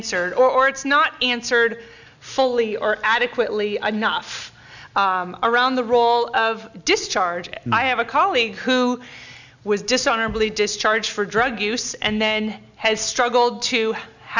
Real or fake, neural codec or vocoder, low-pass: real; none; 7.2 kHz